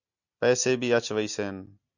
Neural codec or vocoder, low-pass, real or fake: none; 7.2 kHz; real